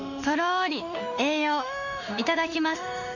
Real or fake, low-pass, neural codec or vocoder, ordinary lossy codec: fake; 7.2 kHz; autoencoder, 48 kHz, 32 numbers a frame, DAC-VAE, trained on Japanese speech; none